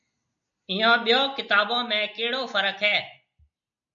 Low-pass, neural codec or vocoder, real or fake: 7.2 kHz; none; real